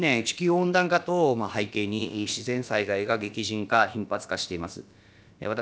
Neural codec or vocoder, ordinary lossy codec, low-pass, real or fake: codec, 16 kHz, about 1 kbps, DyCAST, with the encoder's durations; none; none; fake